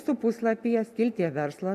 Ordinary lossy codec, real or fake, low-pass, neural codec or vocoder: AAC, 96 kbps; real; 14.4 kHz; none